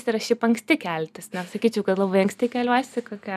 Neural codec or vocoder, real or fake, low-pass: none; real; 14.4 kHz